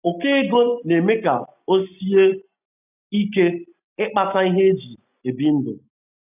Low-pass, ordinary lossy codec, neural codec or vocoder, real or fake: 3.6 kHz; none; none; real